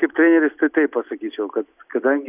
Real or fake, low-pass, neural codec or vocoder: real; 3.6 kHz; none